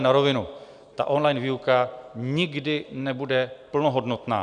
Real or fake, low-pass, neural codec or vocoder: real; 9.9 kHz; none